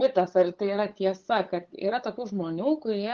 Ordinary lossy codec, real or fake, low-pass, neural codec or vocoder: Opus, 16 kbps; fake; 7.2 kHz; codec, 16 kHz, 8 kbps, FunCodec, trained on LibriTTS, 25 frames a second